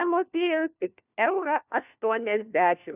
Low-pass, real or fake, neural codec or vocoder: 3.6 kHz; fake; codec, 16 kHz, 1 kbps, FunCodec, trained on LibriTTS, 50 frames a second